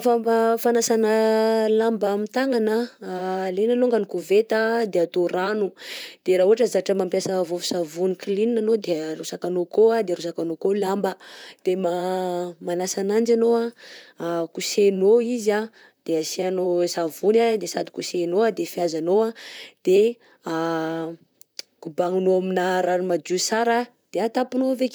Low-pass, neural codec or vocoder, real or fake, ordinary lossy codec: none; vocoder, 44.1 kHz, 128 mel bands, Pupu-Vocoder; fake; none